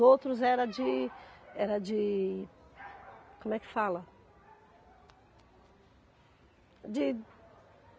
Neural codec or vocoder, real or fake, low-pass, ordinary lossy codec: none; real; none; none